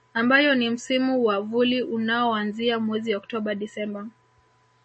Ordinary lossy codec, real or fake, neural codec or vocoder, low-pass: MP3, 32 kbps; real; none; 10.8 kHz